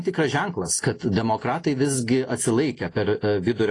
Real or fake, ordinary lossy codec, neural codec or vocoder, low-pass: real; AAC, 32 kbps; none; 10.8 kHz